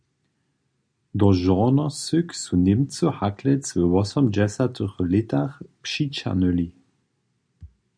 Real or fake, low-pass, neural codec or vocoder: real; 9.9 kHz; none